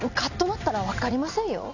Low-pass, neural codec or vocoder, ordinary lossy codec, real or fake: 7.2 kHz; none; none; real